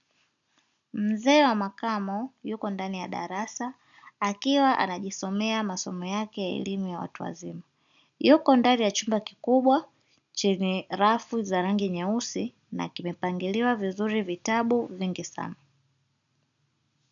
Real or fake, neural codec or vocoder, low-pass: real; none; 7.2 kHz